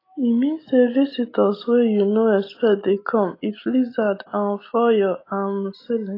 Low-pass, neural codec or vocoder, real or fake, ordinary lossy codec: 5.4 kHz; none; real; AAC, 24 kbps